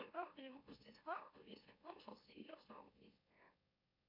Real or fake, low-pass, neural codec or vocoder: fake; 5.4 kHz; autoencoder, 44.1 kHz, a latent of 192 numbers a frame, MeloTTS